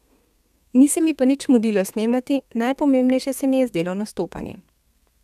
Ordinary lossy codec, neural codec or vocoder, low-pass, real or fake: none; codec, 32 kHz, 1.9 kbps, SNAC; 14.4 kHz; fake